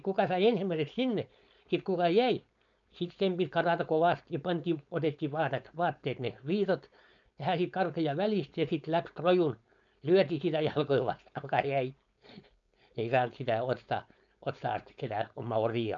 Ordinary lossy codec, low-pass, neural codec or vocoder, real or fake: none; 7.2 kHz; codec, 16 kHz, 4.8 kbps, FACodec; fake